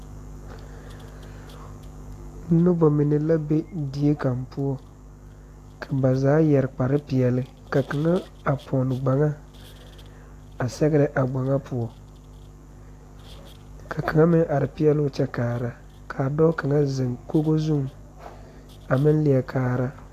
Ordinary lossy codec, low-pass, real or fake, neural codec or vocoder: AAC, 64 kbps; 14.4 kHz; real; none